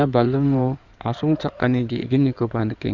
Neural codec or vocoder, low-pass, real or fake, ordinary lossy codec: codec, 16 kHz in and 24 kHz out, 2.2 kbps, FireRedTTS-2 codec; 7.2 kHz; fake; none